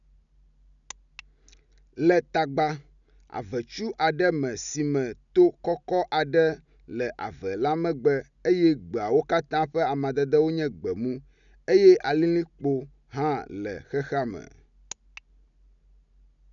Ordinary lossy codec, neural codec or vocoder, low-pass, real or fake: none; none; 7.2 kHz; real